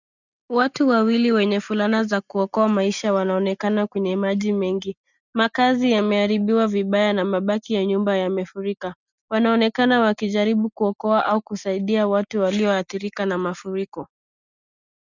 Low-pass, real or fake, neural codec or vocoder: 7.2 kHz; real; none